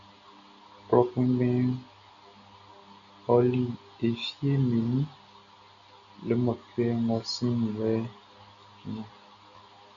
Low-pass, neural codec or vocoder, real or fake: 7.2 kHz; none; real